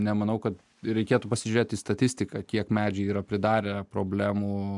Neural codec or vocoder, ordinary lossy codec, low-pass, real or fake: none; MP3, 96 kbps; 10.8 kHz; real